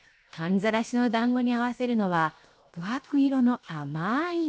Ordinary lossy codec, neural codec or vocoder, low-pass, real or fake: none; codec, 16 kHz, 0.7 kbps, FocalCodec; none; fake